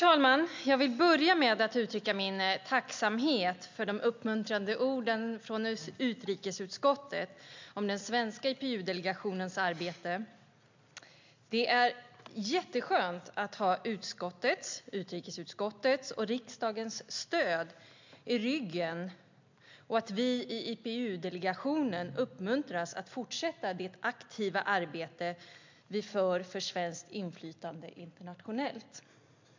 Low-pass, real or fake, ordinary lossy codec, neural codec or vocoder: 7.2 kHz; real; MP3, 64 kbps; none